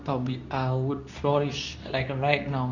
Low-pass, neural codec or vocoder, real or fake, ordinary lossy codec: 7.2 kHz; codec, 24 kHz, 0.9 kbps, WavTokenizer, medium speech release version 2; fake; none